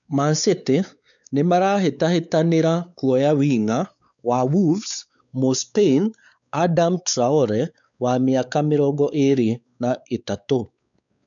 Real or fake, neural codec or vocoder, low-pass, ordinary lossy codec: fake; codec, 16 kHz, 4 kbps, X-Codec, WavLM features, trained on Multilingual LibriSpeech; 7.2 kHz; none